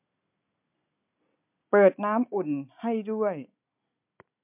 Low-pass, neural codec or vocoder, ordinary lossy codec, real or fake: 3.6 kHz; none; MP3, 32 kbps; real